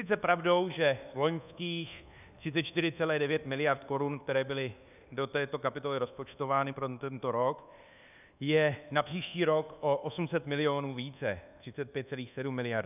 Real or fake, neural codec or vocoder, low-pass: fake; codec, 24 kHz, 1.2 kbps, DualCodec; 3.6 kHz